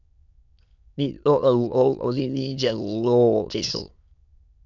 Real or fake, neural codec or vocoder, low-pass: fake; autoencoder, 22.05 kHz, a latent of 192 numbers a frame, VITS, trained on many speakers; 7.2 kHz